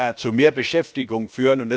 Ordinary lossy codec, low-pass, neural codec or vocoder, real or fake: none; none; codec, 16 kHz, about 1 kbps, DyCAST, with the encoder's durations; fake